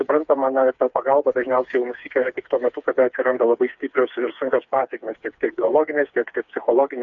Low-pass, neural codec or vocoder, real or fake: 7.2 kHz; codec, 16 kHz, 4 kbps, FreqCodec, smaller model; fake